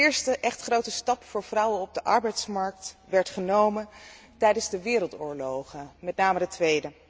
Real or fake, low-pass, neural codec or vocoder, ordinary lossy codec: real; none; none; none